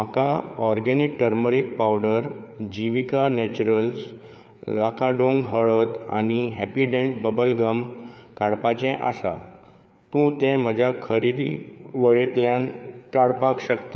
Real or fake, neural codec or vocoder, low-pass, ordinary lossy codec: fake; codec, 16 kHz, 4 kbps, FreqCodec, larger model; none; none